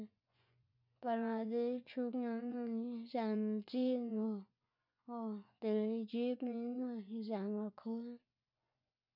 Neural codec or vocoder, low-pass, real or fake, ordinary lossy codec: vocoder, 24 kHz, 100 mel bands, Vocos; 5.4 kHz; fake; none